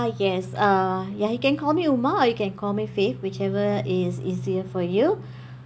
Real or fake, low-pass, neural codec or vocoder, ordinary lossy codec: real; none; none; none